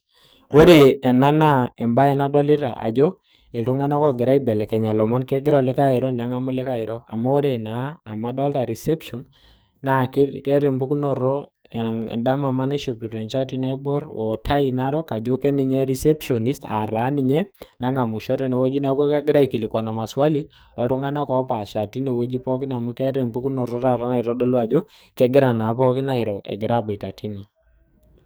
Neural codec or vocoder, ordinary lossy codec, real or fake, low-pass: codec, 44.1 kHz, 2.6 kbps, SNAC; none; fake; none